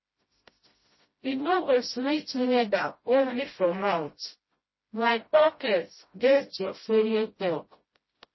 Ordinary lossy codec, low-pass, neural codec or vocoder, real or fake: MP3, 24 kbps; 7.2 kHz; codec, 16 kHz, 0.5 kbps, FreqCodec, smaller model; fake